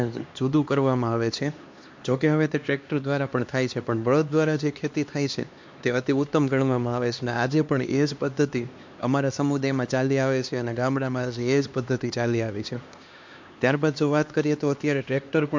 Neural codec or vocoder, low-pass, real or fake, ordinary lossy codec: codec, 16 kHz, 2 kbps, X-Codec, HuBERT features, trained on LibriSpeech; 7.2 kHz; fake; MP3, 48 kbps